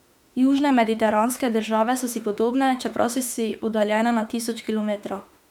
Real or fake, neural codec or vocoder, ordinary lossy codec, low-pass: fake; autoencoder, 48 kHz, 32 numbers a frame, DAC-VAE, trained on Japanese speech; none; 19.8 kHz